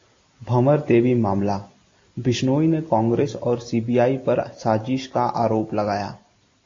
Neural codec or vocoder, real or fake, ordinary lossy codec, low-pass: none; real; AAC, 32 kbps; 7.2 kHz